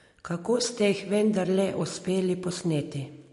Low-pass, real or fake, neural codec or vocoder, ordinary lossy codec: 14.4 kHz; real; none; MP3, 48 kbps